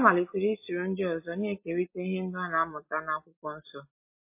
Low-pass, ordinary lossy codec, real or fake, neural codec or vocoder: 3.6 kHz; MP3, 24 kbps; real; none